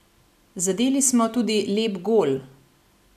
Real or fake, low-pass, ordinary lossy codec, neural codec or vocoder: real; 14.4 kHz; none; none